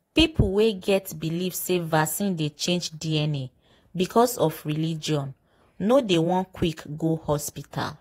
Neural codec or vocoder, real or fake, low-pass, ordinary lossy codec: vocoder, 48 kHz, 128 mel bands, Vocos; fake; 19.8 kHz; AAC, 48 kbps